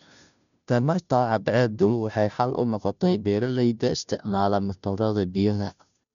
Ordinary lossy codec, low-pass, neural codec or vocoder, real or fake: none; 7.2 kHz; codec, 16 kHz, 0.5 kbps, FunCodec, trained on Chinese and English, 25 frames a second; fake